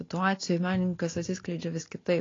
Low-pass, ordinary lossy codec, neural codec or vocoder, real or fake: 7.2 kHz; AAC, 32 kbps; none; real